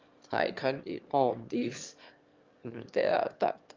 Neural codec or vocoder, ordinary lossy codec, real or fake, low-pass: autoencoder, 22.05 kHz, a latent of 192 numbers a frame, VITS, trained on one speaker; Opus, 32 kbps; fake; 7.2 kHz